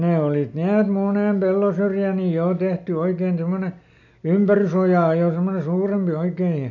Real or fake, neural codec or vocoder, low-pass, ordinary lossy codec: real; none; 7.2 kHz; MP3, 64 kbps